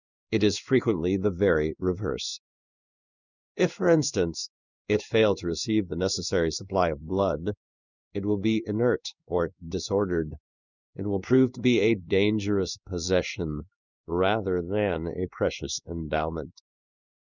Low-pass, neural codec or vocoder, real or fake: 7.2 kHz; codec, 16 kHz in and 24 kHz out, 1 kbps, XY-Tokenizer; fake